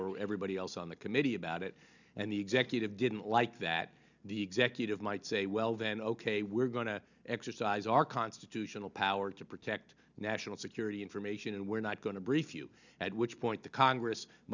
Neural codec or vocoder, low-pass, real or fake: none; 7.2 kHz; real